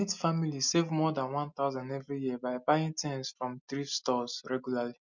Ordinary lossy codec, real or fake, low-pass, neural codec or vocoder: none; real; 7.2 kHz; none